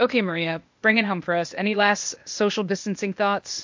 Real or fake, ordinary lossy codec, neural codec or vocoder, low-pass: fake; MP3, 48 kbps; codec, 16 kHz, 0.8 kbps, ZipCodec; 7.2 kHz